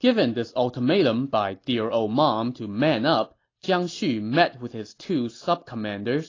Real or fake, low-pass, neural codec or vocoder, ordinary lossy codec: real; 7.2 kHz; none; AAC, 32 kbps